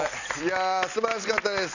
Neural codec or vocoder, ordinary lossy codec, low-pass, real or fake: none; none; 7.2 kHz; real